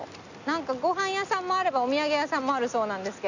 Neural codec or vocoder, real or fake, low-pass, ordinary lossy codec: none; real; 7.2 kHz; none